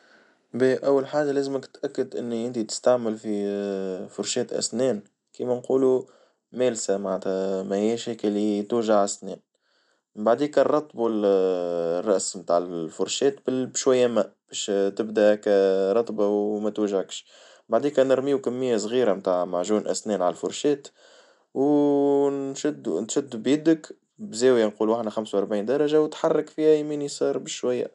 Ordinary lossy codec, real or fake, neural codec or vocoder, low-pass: MP3, 96 kbps; real; none; 10.8 kHz